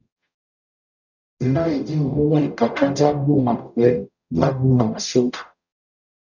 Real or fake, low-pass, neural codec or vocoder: fake; 7.2 kHz; codec, 44.1 kHz, 0.9 kbps, DAC